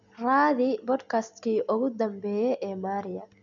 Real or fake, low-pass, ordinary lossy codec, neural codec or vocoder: real; 7.2 kHz; none; none